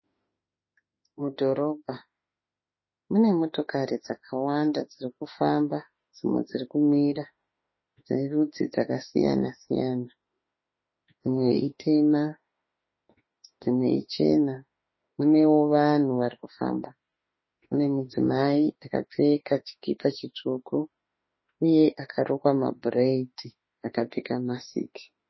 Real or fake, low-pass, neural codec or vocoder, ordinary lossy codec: fake; 7.2 kHz; autoencoder, 48 kHz, 32 numbers a frame, DAC-VAE, trained on Japanese speech; MP3, 24 kbps